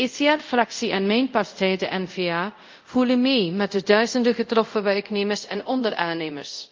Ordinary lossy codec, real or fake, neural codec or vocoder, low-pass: Opus, 24 kbps; fake; codec, 24 kHz, 0.5 kbps, DualCodec; 7.2 kHz